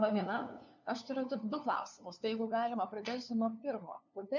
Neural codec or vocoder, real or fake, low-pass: codec, 16 kHz, 2 kbps, FunCodec, trained on LibriTTS, 25 frames a second; fake; 7.2 kHz